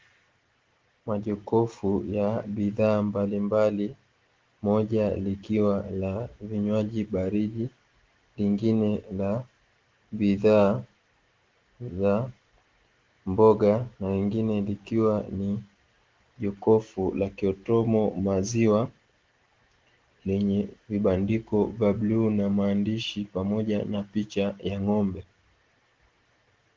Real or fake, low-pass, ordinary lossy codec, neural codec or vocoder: real; 7.2 kHz; Opus, 16 kbps; none